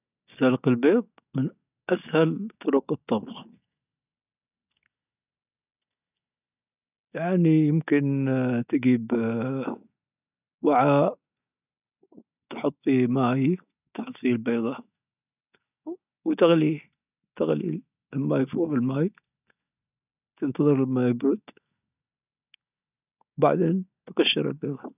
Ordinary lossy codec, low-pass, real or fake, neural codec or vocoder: none; 3.6 kHz; real; none